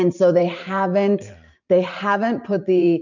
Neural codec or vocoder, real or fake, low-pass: vocoder, 44.1 kHz, 128 mel bands every 512 samples, BigVGAN v2; fake; 7.2 kHz